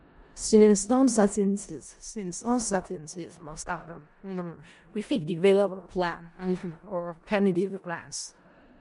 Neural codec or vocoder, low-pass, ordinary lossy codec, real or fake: codec, 16 kHz in and 24 kHz out, 0.4 kbps, LongCat-Audio-Codec, four codebook decoder; 10.8 kHz; MP3, 64 kbps; fake